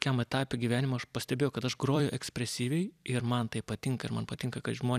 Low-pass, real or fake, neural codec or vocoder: 14.4 kHz; fake; vocoder, 44.1 kHz, 128 mel bands every 256 samples, BigVGAN v2